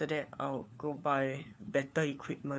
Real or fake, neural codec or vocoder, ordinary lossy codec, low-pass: fake; codec, 16 kHz, 4 kbps, FunCodec, trained on LibriTTS, 50 frames a second; none; none